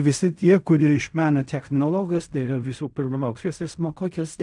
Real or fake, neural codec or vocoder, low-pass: fake; codec, 16 kHz in and 24 kHz out, 0.4 kbps, LongCat-Audio-Codec, fine tuned four codebook decoder; 10.8 kHz